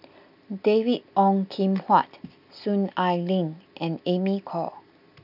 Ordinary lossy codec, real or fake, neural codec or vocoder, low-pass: none; real; none; 5.4 kHz